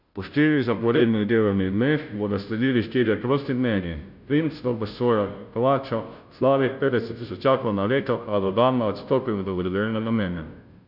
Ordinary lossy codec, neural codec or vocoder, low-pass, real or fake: none; codec, 16 kHz, 0.5 kbps, FunCodec, trained on Chinese and English, 25 frames a second; 5.4 kHz; fake